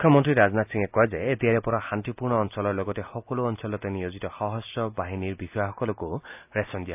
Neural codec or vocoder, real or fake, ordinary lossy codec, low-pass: none; real; AAC, 32 kbps; 3.6 kHz